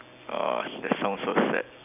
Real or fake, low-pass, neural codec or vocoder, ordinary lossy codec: real; 3.6 kHz; none; none